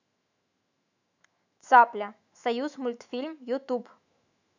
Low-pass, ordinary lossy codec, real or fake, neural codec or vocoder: 7.2 kHz; none; fake; autoencoder, 48 kHz, 128 numbers a frame, DAC-VAE, trained on Japanese speech